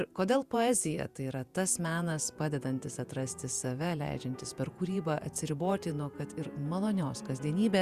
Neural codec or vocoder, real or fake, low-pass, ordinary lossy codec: vocoder, 48 kHz, 128 mel bands, Vocos; fake; 14.4 kHz; Opus, 64 kbps